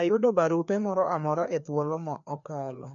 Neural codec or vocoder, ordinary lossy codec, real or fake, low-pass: codec, 16 kHz, 2 kbps, FreqCodec, larger model; none; fake; 7.2 kHz